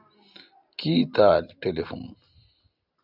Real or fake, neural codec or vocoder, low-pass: real; none; 5.4 kHz